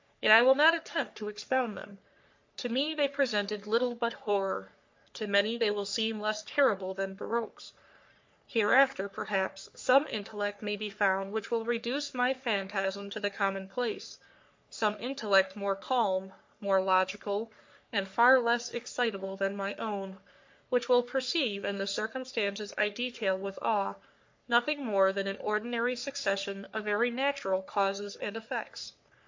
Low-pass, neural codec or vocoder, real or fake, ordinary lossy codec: 7.2 kHz; codec, 44.1 kHz, 3.4 kbps, Pupu-Codec; fake; MP3, 48 kbps